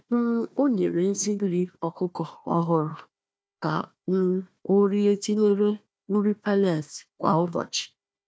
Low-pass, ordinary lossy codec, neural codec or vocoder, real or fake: none; none; codec, 16 kHz, 1 kbps, FunCodec, trained on Chinese and English, 50 frames a second; fake